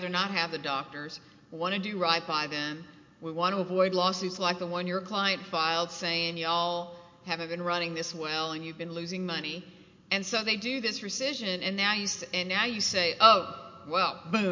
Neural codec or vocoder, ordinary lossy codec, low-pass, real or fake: none; MP3, 48 kbps; 7.2 kHz; real